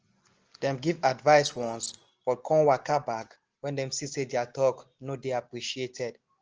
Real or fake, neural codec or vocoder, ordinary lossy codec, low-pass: real; none; Opus, 16 kbps; 7.2 kHz